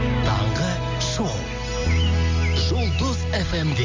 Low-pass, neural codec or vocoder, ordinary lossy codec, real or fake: 7.2 kHz; none; Opus, 32 kbps; real